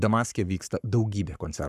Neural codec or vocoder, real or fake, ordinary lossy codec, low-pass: codec, 44.1 kHz, 7.8 kbps, Pupu-Codec; fake; Opus, 64 kbps; 14.4 kHz